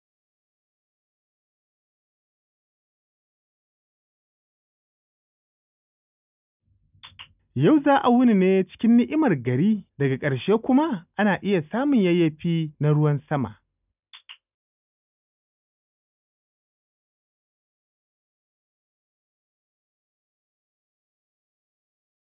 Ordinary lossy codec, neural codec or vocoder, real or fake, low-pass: none; none; real; 3.6 kHz